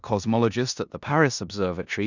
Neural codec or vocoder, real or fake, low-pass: codec, 16 kHz in and 24 kHz out, 0.9 kbps, LongCat-Audio-Codec, four codebook decoder; fake; 7.2 kHz